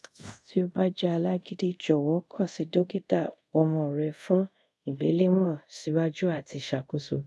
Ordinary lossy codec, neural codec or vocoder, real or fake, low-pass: none; codec, 24 kHz, 0.5 kbps, DualCodec; fake; none